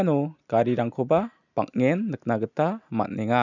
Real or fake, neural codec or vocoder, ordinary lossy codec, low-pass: real; none; none; 7.2 kHz